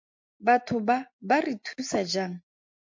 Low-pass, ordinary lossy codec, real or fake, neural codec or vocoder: 7.2 kHz; MP3, 48 kbps; real; none